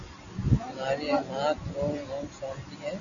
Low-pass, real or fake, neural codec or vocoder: 7.2 kHz; real; none